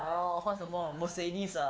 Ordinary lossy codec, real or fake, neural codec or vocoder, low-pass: none; fake; codec, 16 kHz, 4 kbps, X-Codec, WavLM features, trained on Multilingual LibriSpeech; none